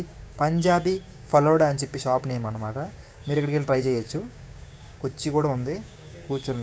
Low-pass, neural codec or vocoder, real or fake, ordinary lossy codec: none; codec, 16 kHz, 6 kbps, DAC; fake; none